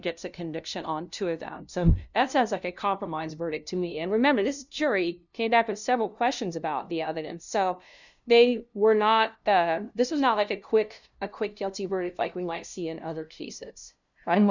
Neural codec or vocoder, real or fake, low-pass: codec, 16 kHz, 0.5 kbps, FunCodec, trained on LibriTTS, 25 frames a second; fake; 7.2 kHz